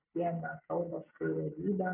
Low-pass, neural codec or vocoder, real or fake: 3.6 kHz; none; real